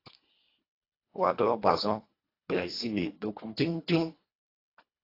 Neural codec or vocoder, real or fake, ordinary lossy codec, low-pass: codec, 24 kHz, 1.5 kbps, HILCodec; fake; AAC, 32 kbps; 5.4 kHz